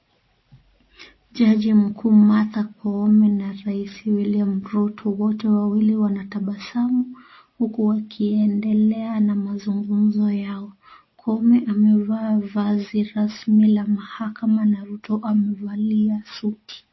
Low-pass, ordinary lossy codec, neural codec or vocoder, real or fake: 7.2 kHz; MP3, 24 kbps; none; real